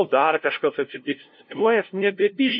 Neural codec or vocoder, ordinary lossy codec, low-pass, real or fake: codec, 16 kHz, 0.5 kbps, FunCodec, trained on LibriTTS, 25 frames a second; MP3, 32 kbps; 7.2 kHz; fake